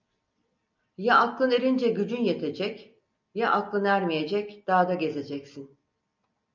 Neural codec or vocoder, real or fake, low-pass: none; real; 7.2 kHz